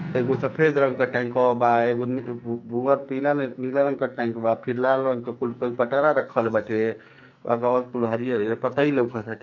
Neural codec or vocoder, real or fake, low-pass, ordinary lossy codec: codec, 44.1 kHz, 2.6 kbps, SNAC; fake; 7.2 kHz; none